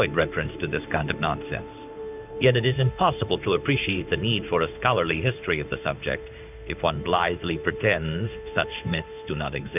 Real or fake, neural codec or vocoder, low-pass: fake; codec, 16 kHz in and 24 kHz out, 1 kbps, XY-Tokenizer; 3.6 kHz